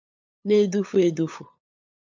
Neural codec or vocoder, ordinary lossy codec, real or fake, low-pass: codec, 16 kHz, 8 kbps, FunCodec, trained on LibriTTS, 25 frames a second; MP3, 64 kbps; fake; 7.2 kHz